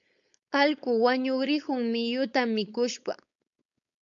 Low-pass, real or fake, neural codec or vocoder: 7.2 kHz; fake; codec, 16 kHz, 4.8 kbps, FACodec